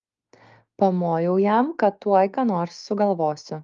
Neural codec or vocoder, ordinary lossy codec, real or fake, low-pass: codec, 16 kHz, 6 kbps, DAC; Opus, 32 kbps; fake; 7.2 kHz